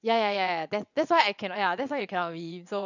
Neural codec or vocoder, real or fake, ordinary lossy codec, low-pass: vocoder, 22.05 kHz, 80 mel bands, WaveNeXt; fake; none; 7.2 kHz